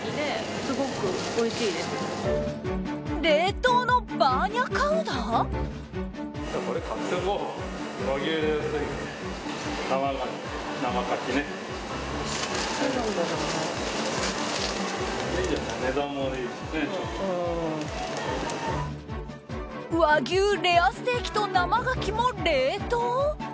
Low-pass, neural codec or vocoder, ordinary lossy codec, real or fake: none; none; none; real